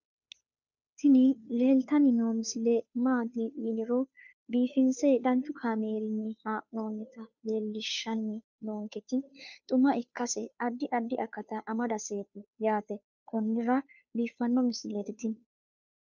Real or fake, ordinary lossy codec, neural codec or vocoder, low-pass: fake; MP3, 64 kbps; codec, 16 kHz, 2 kbps, FunCodec, trained on Chinese and English, 25 frames a second; 7.2 kHz